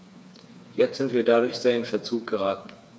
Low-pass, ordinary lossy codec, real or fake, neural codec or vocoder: none; none; fake; codec, 16 kHz, 4 kbps, FreqCodec, smaller model